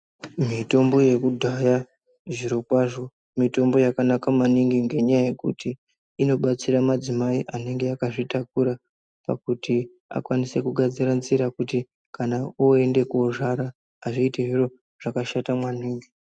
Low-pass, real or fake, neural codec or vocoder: 9.9 kHz; real; none